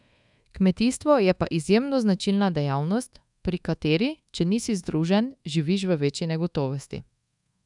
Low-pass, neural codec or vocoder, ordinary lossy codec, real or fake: 10.8 kHz; codec, 24 kHz, 1.2 kbps, DualCodec; none; fake